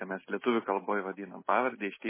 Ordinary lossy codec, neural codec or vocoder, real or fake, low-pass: MP3, 16 kbps; none; real; 3.6 kHz